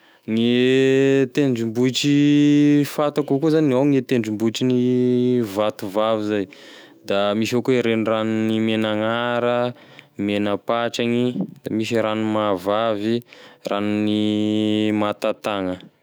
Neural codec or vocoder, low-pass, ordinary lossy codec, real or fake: autoencoder, 48 kHz, 128 numbers a frame, DAC-VAE, trained on Japanese speech; none; none; fake